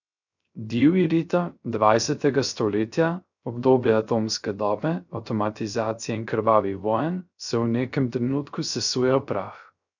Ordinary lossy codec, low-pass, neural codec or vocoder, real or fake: none; 7.2 kHz; codec, 16 kHz, 0.3 kbps, FocalCodec; fake